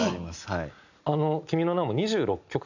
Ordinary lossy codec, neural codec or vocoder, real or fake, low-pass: none; none; real; 7.2 kHz